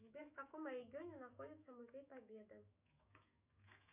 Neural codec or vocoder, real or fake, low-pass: autoencoder, 48 kHz, 128 numbers a frame, DAC-VAE, trained on Japanese speech; fake; 3.6 kHz